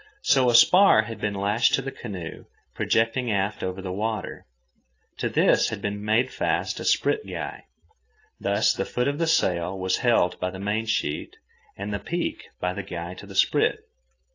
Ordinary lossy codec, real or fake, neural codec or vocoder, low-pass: AAC, 32 kbps; real; none; 7.2 kHz